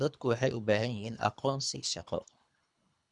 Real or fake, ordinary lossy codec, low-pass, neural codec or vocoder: fake; none; 10.8 kHz; codec, 24 kHz, 3 kbps, HILCodec